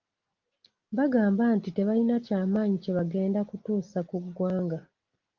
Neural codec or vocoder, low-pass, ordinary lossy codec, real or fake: none; 7.2 kHz; Opus, 24 kbps; real